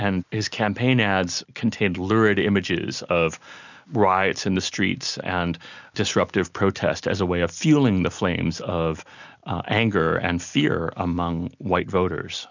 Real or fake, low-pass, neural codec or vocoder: real; 7.2 kHz; none